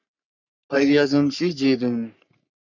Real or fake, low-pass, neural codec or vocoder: fake; 7.2 kHz; codec, 44.1 kHz, 3.4 kbps, Pupu-Codec